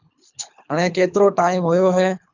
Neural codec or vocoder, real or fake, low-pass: codec, 24 kHz, 3 kbps, HILCodec; fake; 7.2 kHz